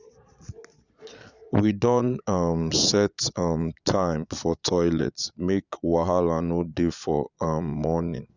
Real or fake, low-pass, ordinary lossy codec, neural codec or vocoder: fake; 7.2 kHz; none; vocoder, 22.05 kHz, 80 mel bands, Vocos